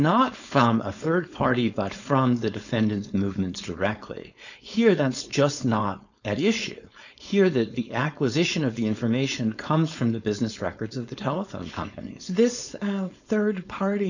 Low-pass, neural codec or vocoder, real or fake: 7.2 kHz; codec, 16 kHz, 4.8 kbps, FACodec; fake